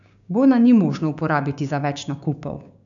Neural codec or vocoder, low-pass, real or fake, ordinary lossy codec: codec, 16 kHz, 6 kbps, DAC; 7.2 kHz; fake; none